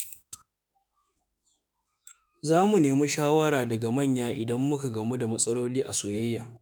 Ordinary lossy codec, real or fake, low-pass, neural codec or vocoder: none; fake; none; autoencoder, 48 kHz, 32 numbers a frame, DAC-VAE, trained on Japanese speech